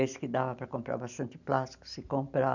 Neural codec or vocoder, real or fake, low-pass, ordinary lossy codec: none; real; 7.2 kHz; none